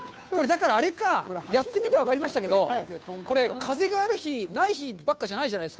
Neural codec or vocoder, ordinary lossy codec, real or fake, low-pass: codec, 16 kHz, 2 kbps, FunCodec, trained on Chinese and English, 25 frames a second; none; fake; none